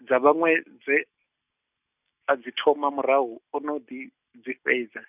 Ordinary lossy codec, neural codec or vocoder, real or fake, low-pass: none; none; real; 3.6 kHz